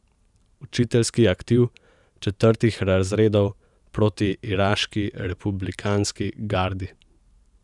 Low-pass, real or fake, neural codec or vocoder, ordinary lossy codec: 10.8 kHz; fake; vocoder, 44.1 kHz, 128 mel bands every 512 samples, BigVGAN v2; none